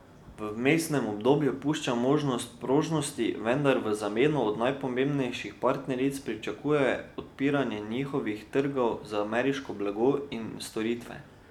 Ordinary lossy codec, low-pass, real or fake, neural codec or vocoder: none; 19.8 kHz; real; none